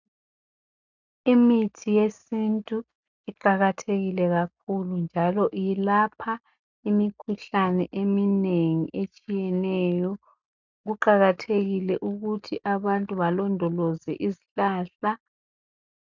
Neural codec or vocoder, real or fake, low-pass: none; real; 7.2 kHz